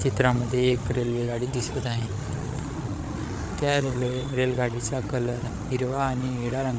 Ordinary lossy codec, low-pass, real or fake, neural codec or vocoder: none; none; fake; codec, 16 kHz, 8 kbps, FreqCodec, larger model